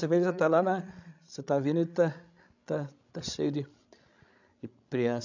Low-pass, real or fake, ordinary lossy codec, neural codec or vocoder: 7.2 kHz; fake; none; codec, 16 kHz, 16 kbps, FreqCodec, larger model